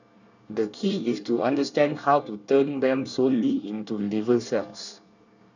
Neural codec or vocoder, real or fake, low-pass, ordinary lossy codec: codec, 24 kHz, 1 kbps, SNAC; fake; 7.2 kHz; none